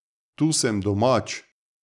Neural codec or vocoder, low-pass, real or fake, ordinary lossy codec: none; 10.8 kHz; real; none